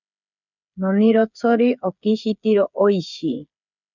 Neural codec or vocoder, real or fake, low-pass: codec, 16 kHz, 8 kbps, FreqCodec, smaller model; fake; 7.2 kHz